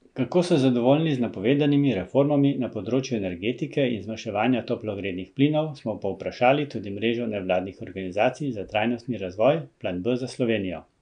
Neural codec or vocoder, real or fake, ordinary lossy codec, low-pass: none; real; none; 9.9 kHz